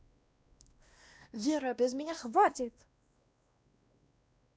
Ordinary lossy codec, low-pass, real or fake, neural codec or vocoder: none; none; fake; codec, 16 kHz, 1 kbps, X-Codec, WavLM features, trained on Multilingual LibriSpeech